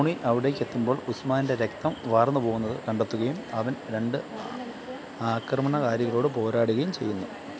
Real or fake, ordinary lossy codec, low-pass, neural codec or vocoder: real; none; none; none